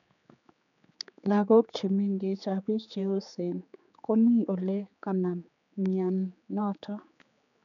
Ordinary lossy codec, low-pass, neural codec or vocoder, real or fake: none; 7.2 kHz; codec, 16 kHz, 4 kbps, X-Codec, HuBERT features, trained on general audio; fake